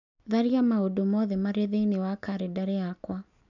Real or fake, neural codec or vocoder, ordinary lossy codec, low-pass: real; none; none; 7.2 kHz